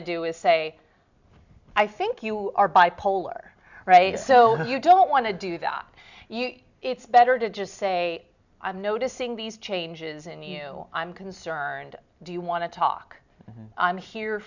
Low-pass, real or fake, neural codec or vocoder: 7.2 kHz; real; none